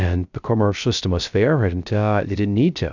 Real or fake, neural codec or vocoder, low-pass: fake; codec, 16 kHz, 0.3 kbps, FocalCodec; 7.2 kHz